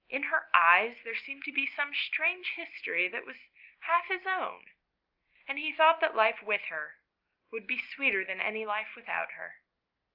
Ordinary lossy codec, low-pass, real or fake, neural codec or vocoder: Opus, 32 kbps; 5.4 kHz; real; none